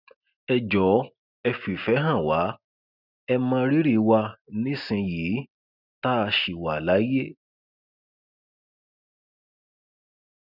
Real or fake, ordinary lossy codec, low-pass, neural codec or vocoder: real; none; 5.4 kHz; none